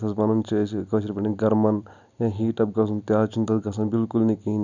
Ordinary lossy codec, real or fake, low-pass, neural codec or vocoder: none; real; 7.2 kHz; none